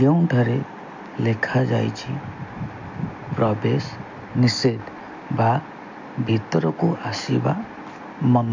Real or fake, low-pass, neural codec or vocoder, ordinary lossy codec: real; 7.2 kHz; none; MP3, 48 kbps